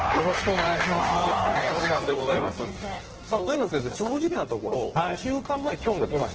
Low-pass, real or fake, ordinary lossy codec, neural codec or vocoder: 7.2 kHz; fake; Opus, 16 kbps; codec, 16 kHz in and 24 kHz out, 1.1 kbps, FireRedTTS-2 codec